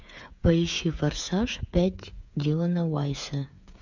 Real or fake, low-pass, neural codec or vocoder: fake; 7.2 kHz; codec, 16 kHz, 8 kbps, FreqCodec, smaller model